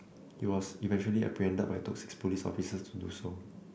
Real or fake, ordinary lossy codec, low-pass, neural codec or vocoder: real; none; none; none